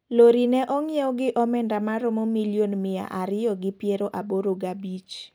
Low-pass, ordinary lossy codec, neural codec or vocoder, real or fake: none; none; none; real